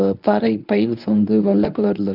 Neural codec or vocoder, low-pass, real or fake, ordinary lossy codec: codec, 24 kHz, 0.9 kbps, WavTokenizer, medium speech release version 1; 5.4 kHz; fake; none